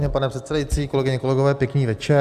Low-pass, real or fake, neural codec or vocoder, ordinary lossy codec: 14.4 kHz; real; none; Opus, 64 kbps